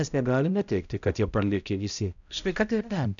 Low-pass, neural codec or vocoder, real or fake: 7.2 kHz; codec, 16 kHz, 0.5 kbps, X-Codec, HuBERT features, trained on balanced general audio; fake